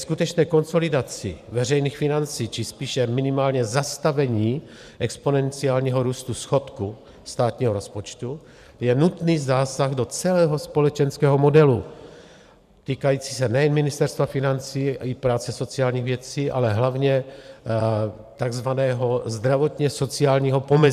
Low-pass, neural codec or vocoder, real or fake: 14.4 kHz; vocoder, 44.1 kHz, 128 mel bands every 512 samples, BigVGAN v2; fake